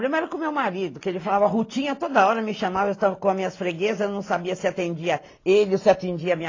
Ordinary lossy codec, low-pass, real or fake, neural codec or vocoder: AAC, 32 kbps; 7.2 kHz; real; none